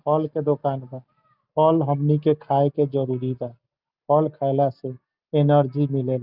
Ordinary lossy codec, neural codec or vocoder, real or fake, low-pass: Opus, 24 kbps; none; real; 5.4 kHz